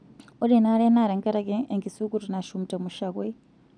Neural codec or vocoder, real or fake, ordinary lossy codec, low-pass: none; real; none; 9.9 kHz